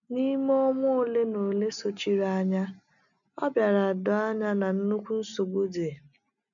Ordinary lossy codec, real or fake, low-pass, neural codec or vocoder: none; real; 7.2 kHz; none